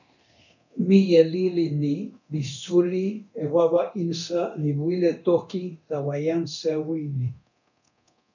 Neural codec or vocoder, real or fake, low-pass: codec, 24 kHz, 0.9 kbps, DualCodec; fake; 7.2 kHz